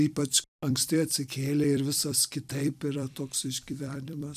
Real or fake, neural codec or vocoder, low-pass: fake; vocoder, 44.1 kHz, 128 mel bands every 256 samples, BigVGAN v2; 14.4 kHz